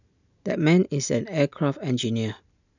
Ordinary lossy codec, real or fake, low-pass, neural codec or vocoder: none; real; 7.2 kHz; none